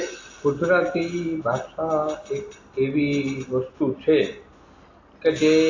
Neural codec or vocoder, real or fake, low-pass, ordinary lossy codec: none; real; 7.2 kHz; AAC, 32 kbps